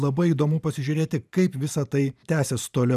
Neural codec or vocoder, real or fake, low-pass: vocoder, 44.1 kHz, 128 mel bands every 512 samples, BigVGAN v2; fake; 14.4 kHz